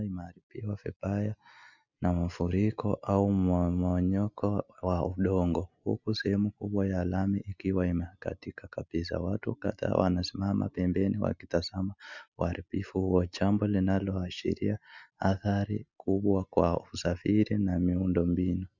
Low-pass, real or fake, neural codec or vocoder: 7.2 kHz; real; none